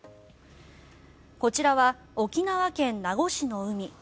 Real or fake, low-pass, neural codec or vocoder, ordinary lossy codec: real; none; none; none